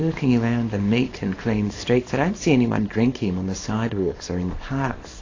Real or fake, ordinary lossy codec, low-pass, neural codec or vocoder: fake; AAC, 32 kbps; 7.2 kHz; codec, 24 kHz, 0.9 kbps, WavTokenizer, small release